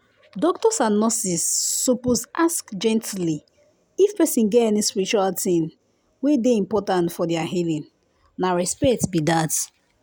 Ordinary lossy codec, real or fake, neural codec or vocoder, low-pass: none; real; none; none